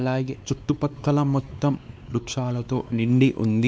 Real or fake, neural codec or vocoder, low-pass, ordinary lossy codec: fake; codec, 16 kHz, 2 kbps, X-Codec, WavLM features, trained on Multilingual LibriSpeech; none; none